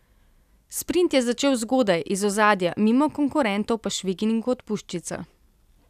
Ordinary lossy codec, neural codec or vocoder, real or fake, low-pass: none; none; real; 14.4 kHz